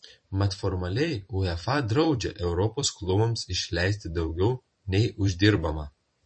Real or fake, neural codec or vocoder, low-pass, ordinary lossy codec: fake; vocoder, 44.1 kHz, 128 mel bands every 512 samples, BigVGAN v2; 9.9 kHz; MP3, 32 kbps